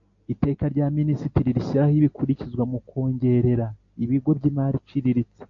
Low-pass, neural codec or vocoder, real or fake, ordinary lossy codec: 7.2 kHz; none; real; AAC, 48 kbps